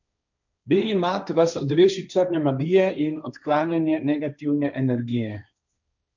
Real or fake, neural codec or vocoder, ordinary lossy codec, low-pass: fake; codec, 16 kHz, 1.1 kbps, Voila-Tokenizer; none; 7.2 kHz